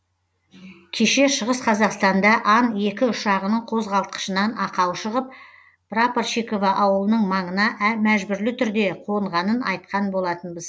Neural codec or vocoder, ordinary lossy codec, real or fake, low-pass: none; none; real; none